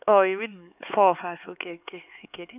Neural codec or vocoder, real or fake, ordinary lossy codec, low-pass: codec, 16 kHz, 4 kbps, X-Codec, HuBERT features, trained on LibriSpeech; fake; none; 3.6 kHz